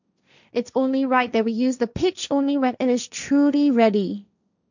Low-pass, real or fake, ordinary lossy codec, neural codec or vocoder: 7.2 kHz; fake; none; codec, 16 kHz, 1.1 kbps, Voila-Tokenizer